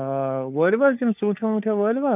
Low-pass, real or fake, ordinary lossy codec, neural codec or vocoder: 3.6 kHz; fake; none; codec, 16 kHz, 4 kbps, FunCodec, trained on LibriTTS, 50 frames a second